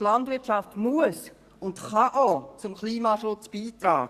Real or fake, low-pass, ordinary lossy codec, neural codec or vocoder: fake; 14.4 kHz; Opus, 64 kbps; codec, 44.1 kHz, 2.6 kbps, SNAC